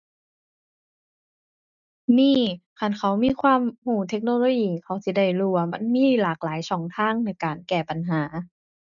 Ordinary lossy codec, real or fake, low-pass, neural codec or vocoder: none; real; 7.2 kHz; none